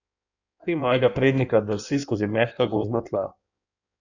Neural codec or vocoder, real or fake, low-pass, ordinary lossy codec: codec, 16 kHz in and 24 kHz out, 1.1 kbps, FireRedTTS-2 codec; fake; 7.2 kHz; none